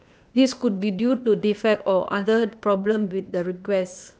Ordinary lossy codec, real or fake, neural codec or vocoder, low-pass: none; fake; codec, 16 kHz, 0.8 kbps, ZipCodec; none